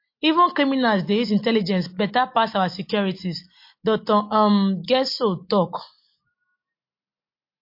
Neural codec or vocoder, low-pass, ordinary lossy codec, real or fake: none; 5.4 kHz; MP3, 32 kbps; real